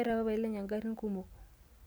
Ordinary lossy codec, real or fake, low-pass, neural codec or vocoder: none; real; none; none